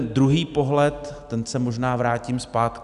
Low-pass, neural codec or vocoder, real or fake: 10.8 kHz; none; real